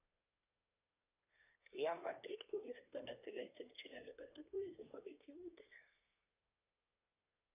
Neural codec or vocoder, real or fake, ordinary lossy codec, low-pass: codec, 24 kHz, 1 kbps, SNAC; fake; none; 3.6 kHz